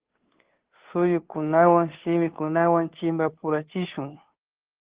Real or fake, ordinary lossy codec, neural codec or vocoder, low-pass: fake; Opus, 16 kbps; codec, 16 kHz, 2 kbps, FunCodec, trained on Chinese and English, 25 frames a second; 3.6 kHz